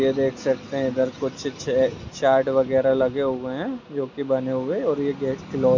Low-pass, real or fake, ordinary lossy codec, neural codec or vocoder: 7.2 kHz; real; MP3, 64 kbps; none